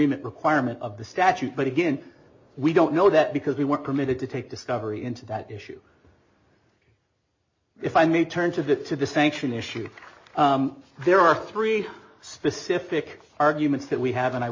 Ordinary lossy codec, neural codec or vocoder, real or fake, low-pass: MP3, 32 kbps; none; real; 7.2 kHz